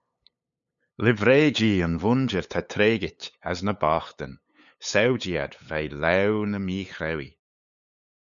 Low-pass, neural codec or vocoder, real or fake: 7.2 kHz; codec, 16 kHz, 8 kbps, FunCodec, trained on LibriTTS, 25 frames a second; fake